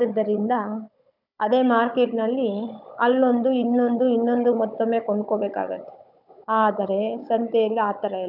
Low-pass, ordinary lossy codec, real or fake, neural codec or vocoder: 5.4 kHz; none; fake; codec, 16 kHz, 16 kbps, FunCodec, trained on Chinese and English, 50 frames a second